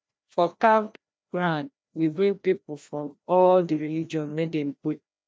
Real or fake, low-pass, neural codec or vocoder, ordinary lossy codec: fake; none; codec, 16 kHz, 1 kbps, FreqCodec, larger model; none